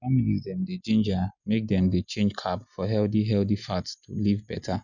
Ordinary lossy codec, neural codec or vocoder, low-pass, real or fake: none; none; 7.2 kHz; real